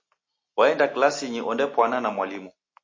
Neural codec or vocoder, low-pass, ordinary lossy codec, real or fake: none; 7.2 kHz; MP3, 32 kbps; real